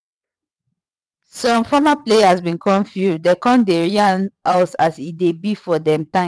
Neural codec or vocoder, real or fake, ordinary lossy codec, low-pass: vocoder, 22.05 kHz, 80 mel bands, WaveNeXt; fake; none; none